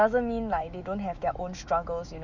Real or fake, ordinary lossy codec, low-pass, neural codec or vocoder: fake; none; 7.2 kHz; autoencoder, 48 kHz, 128 numbers a frame, DAC-VAE, trained on Japanese speech